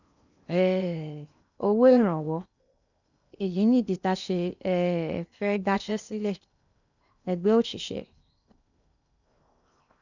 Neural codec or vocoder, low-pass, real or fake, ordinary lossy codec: codec, 16 kHz in and 24 kHz out, 0.8 kbps, FocalCodec, streaming, 65536 codes; 7.2 kHz; fake; none